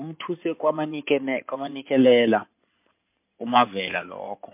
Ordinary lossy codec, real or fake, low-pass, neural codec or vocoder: MP3, 32 kbps; fake; 3.6 kHz; codec, 16 kHz in and 24 kHz out, 2.2 kbps, FireRedTTS-2 codec